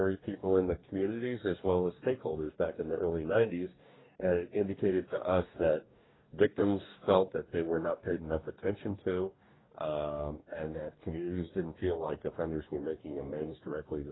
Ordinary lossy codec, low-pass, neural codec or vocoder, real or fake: AAC, 16 kbps; 7.2 kHz; codec, 44.1 kHz, 2.6 kbps, DAC; fake